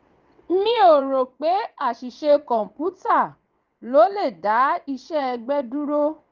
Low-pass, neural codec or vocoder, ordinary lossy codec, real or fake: 7.2 kHz; vocoder, 22.05 kHz, 80 mel bands, WaveNeXt; Opus, 32 kbps; fake